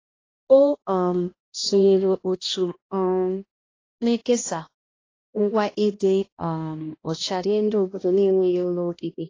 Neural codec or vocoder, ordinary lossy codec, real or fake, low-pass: codec, 16 kHz, 1 kbps, X-Codec, HuBERT features, trained on balanced general audio; AAC, 32 kbps; fake; 7.2 kHz